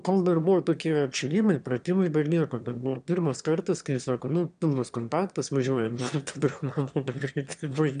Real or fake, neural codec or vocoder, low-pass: fake; autoencoder, 22.05 kHz, a latent of 192 numbers a frame, VITS, trained on one speaker; 9.9 kHz